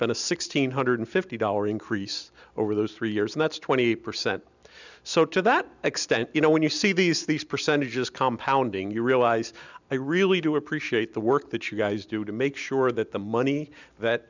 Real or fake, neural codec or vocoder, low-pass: real; none; 7.2 kHz